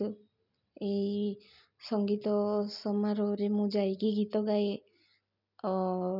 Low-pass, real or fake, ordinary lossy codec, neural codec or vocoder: 5.4 kHz; real; none; none